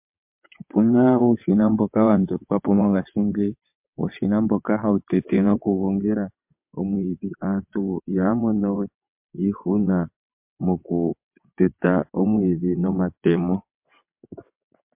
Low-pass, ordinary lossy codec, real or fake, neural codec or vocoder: 3.6 kHz; MP3, 32 kbps; fake; vocoder, 22.05 kHz, 80 mel bands, WaveNeXt